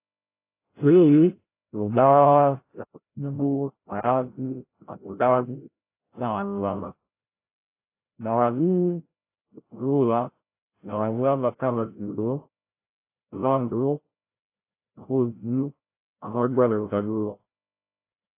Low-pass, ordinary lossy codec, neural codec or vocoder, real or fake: 3.6 kHz; AAC, 24 kbps; codec, 16 kHz, 0.5 kbps, FreqCodec, larger model; fake